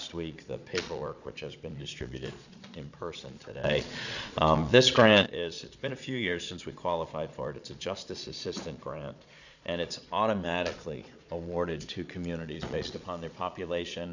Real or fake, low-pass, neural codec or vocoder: fake; 7.2 kHz; vocoder, 22.05 kHz, 80 mel bands, Vocos